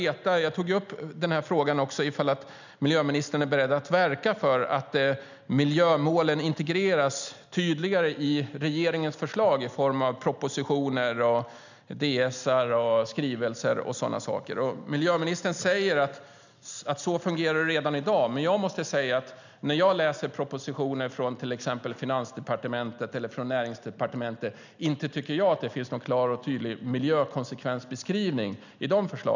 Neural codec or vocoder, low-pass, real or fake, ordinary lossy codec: none; 7.2 kHz; real; none